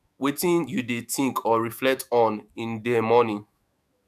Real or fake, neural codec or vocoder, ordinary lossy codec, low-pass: fake; autoencoder, 48 kHz, 128 numbers a frame, DAC-VAE, trained on Japanese speech; none; 14.4 kHz